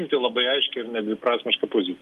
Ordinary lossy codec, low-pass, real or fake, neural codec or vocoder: Opus, 32 kbps; 14.4 kHz; real; none